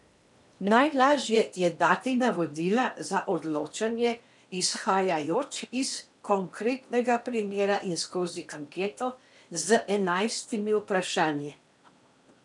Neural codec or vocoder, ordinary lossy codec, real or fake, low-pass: codec, 16 kHz in and 24 kHz out, 0.8 kbps, FocalCodec, streaming, 65536 codes; none; fake; 10.8 kHz